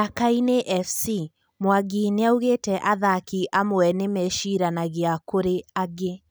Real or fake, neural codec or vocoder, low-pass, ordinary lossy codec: real; none; none; none